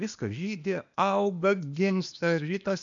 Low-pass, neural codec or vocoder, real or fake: 7.2 kHz; codec, 16 kHz, 0.8 kbps, ZipCodec; fake